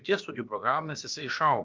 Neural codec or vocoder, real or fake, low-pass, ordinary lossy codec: codec, 16 kHz, about 1 kbps, DyCAST, with the encoder's durations; fake; 7.2 kHz; Opus, 24 kbps